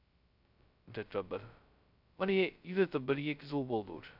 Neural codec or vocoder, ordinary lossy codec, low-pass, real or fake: codec, 16 kHz, 0.2 kbps, FocalCodec; none; 5.4 kHz; fake